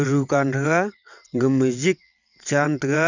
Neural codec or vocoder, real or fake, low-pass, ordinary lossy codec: vocoder, 22.05 kHz, 80 mel bands, WaveNeXt; fake; 7.2 kHz; none